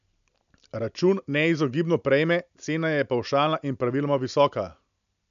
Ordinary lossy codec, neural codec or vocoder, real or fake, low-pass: none; none; real; 7.2 kHz